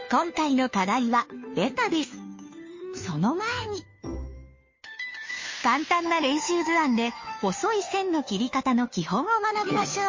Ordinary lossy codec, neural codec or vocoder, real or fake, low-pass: MP3, 32 kbps; codec, 16 kHz, 2 kbps, FunCodec, trained on Chinese and English, 25 frames a second; fake; 7.2 kHz